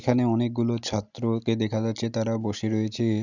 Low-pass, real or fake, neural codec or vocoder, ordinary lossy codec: 7.2 kHz; real; none; none